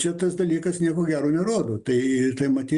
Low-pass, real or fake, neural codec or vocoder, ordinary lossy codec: 10.8 kHz; real; none; Opus, 64 kbps